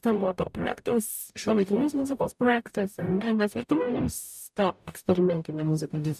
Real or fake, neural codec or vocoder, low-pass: fake; codec, 44.1 kHz, 0.9 kbps, DAC; 14.4 kHz